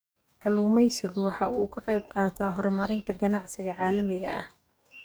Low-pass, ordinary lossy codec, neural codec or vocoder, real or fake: none; none; codec, 44.1 kHz, 2.6 kbps, DAC; fake